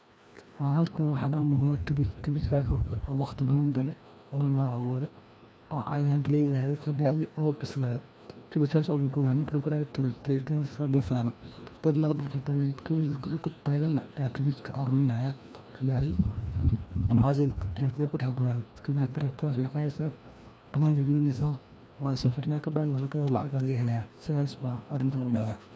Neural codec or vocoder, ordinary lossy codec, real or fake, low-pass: codec, 16 kHz, 1 kbps, FreqCodec, larger model; none; fake; none